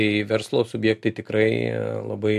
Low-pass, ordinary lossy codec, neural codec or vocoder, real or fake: 14.4 kHz; AAC, 96 kbps; none; real